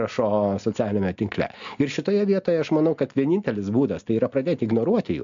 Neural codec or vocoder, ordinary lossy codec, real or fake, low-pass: none; MP3, 64 kbps; real; 7.2 kHz